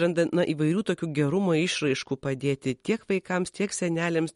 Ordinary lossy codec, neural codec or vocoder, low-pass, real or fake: MP3, 48 kbps; none; 19.8 kHz; real